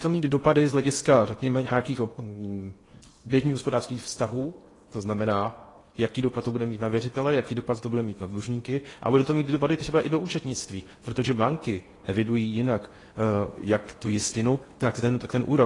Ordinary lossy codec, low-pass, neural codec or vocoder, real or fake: AAC, 32 kbps; 10.8 kHz; codec, 16 kHz in and 24 kHz out, 0.8 kbps, FocalCodec, streaming, 65536 codes; fake